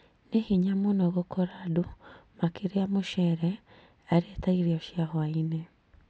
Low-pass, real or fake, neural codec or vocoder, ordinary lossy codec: none; real; none; none